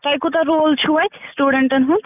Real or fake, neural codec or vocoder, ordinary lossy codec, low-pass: real; none; none; 3.6 kHz